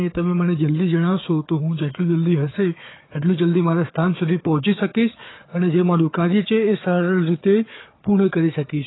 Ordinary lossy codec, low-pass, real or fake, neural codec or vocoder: AAC, 16 kbps; 7.2 kHz; fake; codec, 16 kHz, 4 kbps, FunCodec, trained on Chinese and English, 50 frames a second